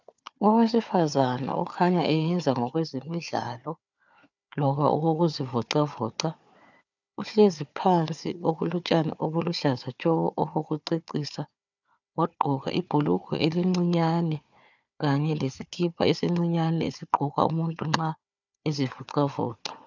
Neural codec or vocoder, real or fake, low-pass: codec, 16 kHz, 4 kbps, FunCodec, trained on Chinese and English, 50 frames a second; fake; 7.2 kHz